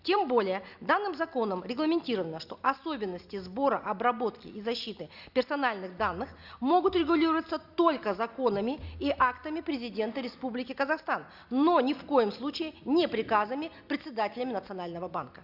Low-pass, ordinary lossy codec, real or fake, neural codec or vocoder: 5.4 kHz; Opus, 64 kbps; real; none